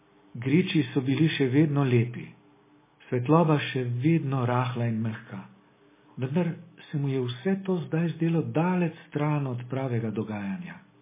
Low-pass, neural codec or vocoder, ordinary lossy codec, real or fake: 3.6 kHz; none; MP3, 16 kbps; real